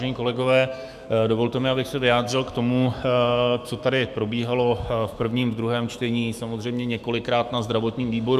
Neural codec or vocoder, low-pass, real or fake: autoencoder, 48 kHz, 128 numbers a frame, DAC-VAE, trained on Japanese speech; 14.4 kHz; fake